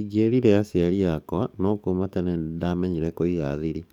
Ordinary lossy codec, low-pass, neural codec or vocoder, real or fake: none; 19.8 kHz; codec, 44.1 kHz, 7.8 kbps, DAC; fake